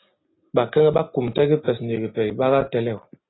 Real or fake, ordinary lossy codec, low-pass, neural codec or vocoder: real; AAC, 16 kbps; 7.2 kHz; none